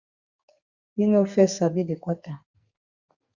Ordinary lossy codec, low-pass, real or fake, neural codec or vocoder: Opus, 64 kbps; 7.2 kHz; fake; codec, 44.1 kHz, 2.6 kbps, SNAC